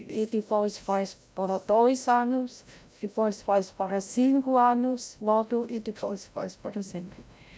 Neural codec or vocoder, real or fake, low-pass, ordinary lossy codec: codec, 16 kHz, 0.5 kbps, FreqCodec, larger model; fake; none; none